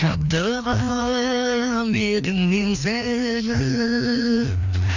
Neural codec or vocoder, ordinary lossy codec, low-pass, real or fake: codec, 16 kHz, 1 kbps, FreqCodec, larger model; none; 7.2 kHz; fake